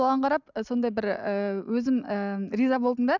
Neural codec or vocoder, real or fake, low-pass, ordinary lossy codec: none; real; 7.2 kHz; none